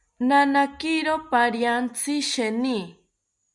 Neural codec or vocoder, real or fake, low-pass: none; real; 10.8 kHz